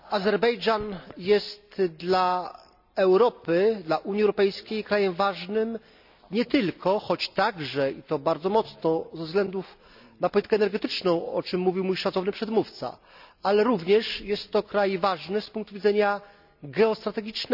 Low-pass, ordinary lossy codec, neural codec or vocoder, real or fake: 5.4 kHz; none; none; real